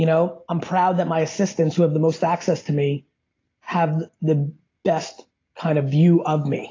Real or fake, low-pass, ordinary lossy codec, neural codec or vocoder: real; 7.2 kHz; AAC, 32 kbps; none